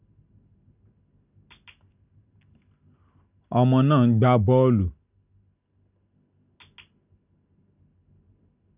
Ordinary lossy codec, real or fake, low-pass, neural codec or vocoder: none; real; 3.6 kHz; none